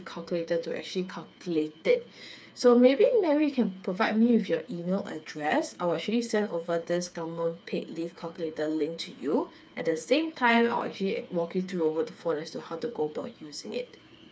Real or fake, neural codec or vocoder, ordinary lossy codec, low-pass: fake; codec, 16 kHz, 4 kbps, FreqCodec, smaller model; none; none